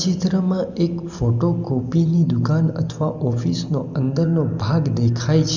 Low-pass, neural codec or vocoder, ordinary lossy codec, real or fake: 7.2 kHz; none; none; real